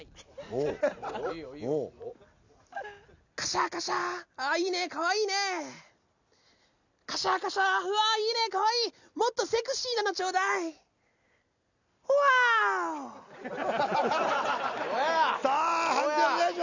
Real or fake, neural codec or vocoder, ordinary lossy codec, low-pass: real; none; none; 7.2 kHz